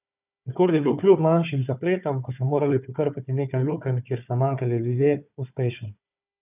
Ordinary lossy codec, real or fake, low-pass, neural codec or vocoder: none; fake; 3.6 kHz; codec, 16 kHz, 4 kbps, FunCodec, trained on Chinese and English, 50 frames a second